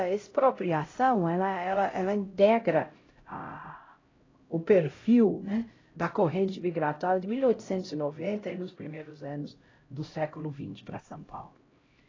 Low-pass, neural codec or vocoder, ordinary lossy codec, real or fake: 7.2 kHz; codec, 16 kHz, 0.5 kbps, X-Codec, HuBERT features, trained on LibriSpeech; AAC, 32 kbps; fake